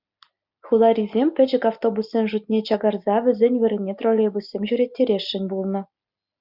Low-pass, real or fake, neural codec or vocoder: 5.4 kHz; real; none